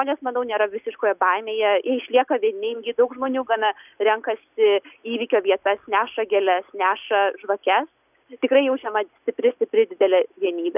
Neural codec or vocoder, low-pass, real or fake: none; 3.6 kHz; real